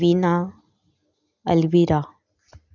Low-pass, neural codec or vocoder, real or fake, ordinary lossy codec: 7.2 kHz; none; real; none